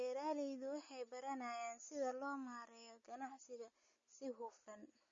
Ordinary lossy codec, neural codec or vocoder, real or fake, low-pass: MP3, 32 kbps; none; real; 7.2 kHz